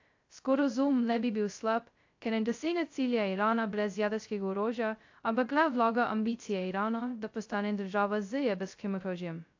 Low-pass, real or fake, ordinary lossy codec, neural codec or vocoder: 7.2 kHz; fake; AAC, 48 kbps; codec, 16 kHz, 0.2 kbps, FocalCodec